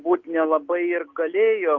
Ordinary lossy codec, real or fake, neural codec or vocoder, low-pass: Opus, 24 kbps; real; none; 7.2 kHz